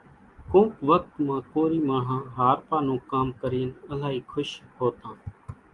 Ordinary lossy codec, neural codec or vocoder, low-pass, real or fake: Opus, 24 kbps; none; 10.8 kHz; real